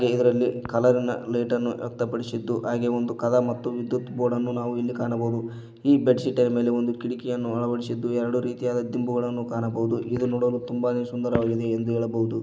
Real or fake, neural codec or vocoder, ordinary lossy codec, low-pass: real; none; none; none